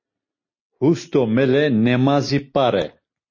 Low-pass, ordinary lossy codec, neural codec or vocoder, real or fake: 7.2 kHz; MP3, 32 kbps; none; real